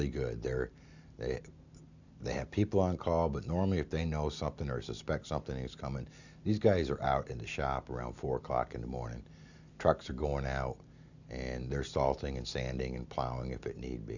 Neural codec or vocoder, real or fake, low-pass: none; real; 7.2 kHz